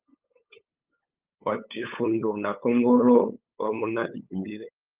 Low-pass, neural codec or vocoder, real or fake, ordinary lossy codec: 3.6 kHz; codec, 16 kHz, 8 kbps, FunCodec, trained on LibriTTS, 25 frames a second; fake; Opus, 24 kbps